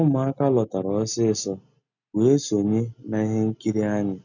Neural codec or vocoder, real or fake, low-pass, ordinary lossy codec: none; real; 7.2 kHz; none